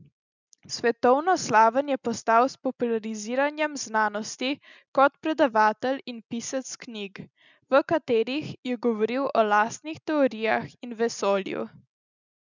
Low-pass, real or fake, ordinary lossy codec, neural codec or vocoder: 7.2 kHz; real; none; none